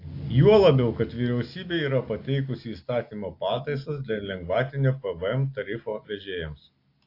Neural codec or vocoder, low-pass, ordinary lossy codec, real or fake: none; 5.4 kHz; AAC, 32 kbps; real